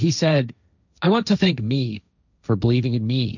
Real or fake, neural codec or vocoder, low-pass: fake; codec, 16 kHz, 1.1 kbps, Voila-Tokenizer; 7.2 kHz